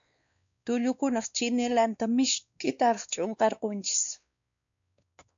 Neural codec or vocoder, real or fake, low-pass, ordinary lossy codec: codec, 16 kHz, 2 kbps, X-Codec, WavLM features, trained on Multilingual LibriSpeech; fake; 7.2 kHz; MP3, 64 kbps